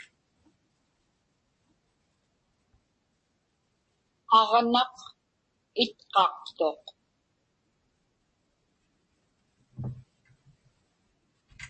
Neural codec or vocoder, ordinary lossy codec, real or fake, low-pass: none; MP3, 32 kbps; real; 10.8 kHz